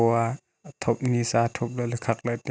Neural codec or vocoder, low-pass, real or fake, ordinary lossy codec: none; none; real; none